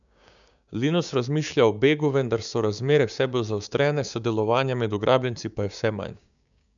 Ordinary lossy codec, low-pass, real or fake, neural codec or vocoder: none; 7.2 kHz; fake; codec, 16 kHz, 6 kbps, DAC